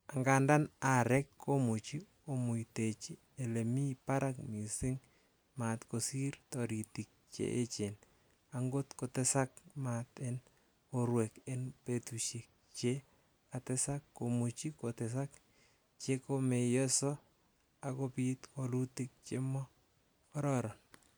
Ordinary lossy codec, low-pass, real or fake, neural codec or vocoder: none; none; real; none